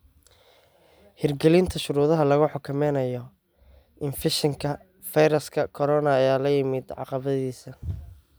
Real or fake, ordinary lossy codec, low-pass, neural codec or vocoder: real; none; none; none